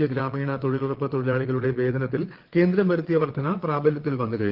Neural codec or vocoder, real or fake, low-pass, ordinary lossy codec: vocoder, 22.05 kHz, 80 mel bands, Vocos; fake; 5.4 kHz; Opus, 16 kbps